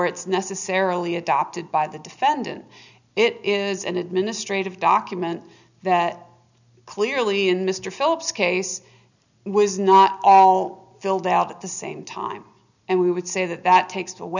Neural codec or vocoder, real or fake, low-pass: none; real; 7.2 kHz